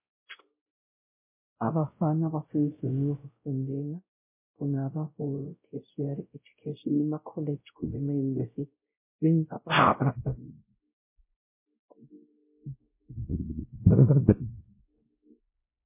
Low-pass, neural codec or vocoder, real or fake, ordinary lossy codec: 3.6 kHz; codec, 16 kHz, 0.5 kbps, X-Codec, WavLM features, trained on Multilingual LibriSpeech; fake; MP3, 24 kbps